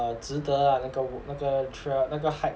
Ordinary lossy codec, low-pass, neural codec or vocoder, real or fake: none; none; none; real